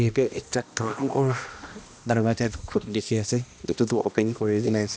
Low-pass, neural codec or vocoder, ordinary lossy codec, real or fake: none; codec, 16 kHz, 1 kbps, X-Codec, HuBERT features, trained on balanced general audio; none; fake